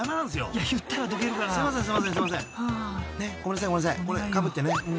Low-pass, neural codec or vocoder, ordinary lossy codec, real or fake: none; none; none; real